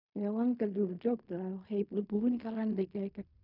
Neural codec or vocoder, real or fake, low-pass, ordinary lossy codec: codec, 16 kHz in and 24 kHz out, 0.4 kbps, LongCat-Audio-Codec, fine tuned four codebook decoder; fake; 5.4 kHz; none